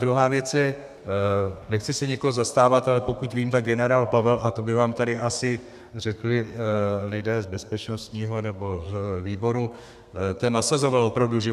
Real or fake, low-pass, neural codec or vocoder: fake; 14.4 kHz; codec, 32 kHz, 1.9 kbps, SNAC